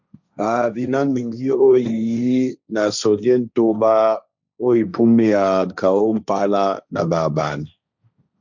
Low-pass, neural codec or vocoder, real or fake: 7.2 kHz; codec, 16 kHz, 1.1 kbps, Voila-Tokenizer; fake